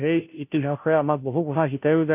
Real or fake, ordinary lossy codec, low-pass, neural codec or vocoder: fake; none; 3.6 kHz; codec, 16 kHz, 0.5 kbps, FunCodec, trained on Chinese and English, 25 frames a second